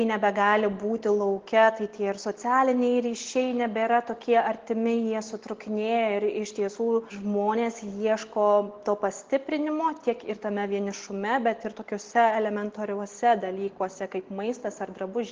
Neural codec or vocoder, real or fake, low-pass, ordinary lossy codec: none; real; 7.2 kHz; Opus, 16 kbps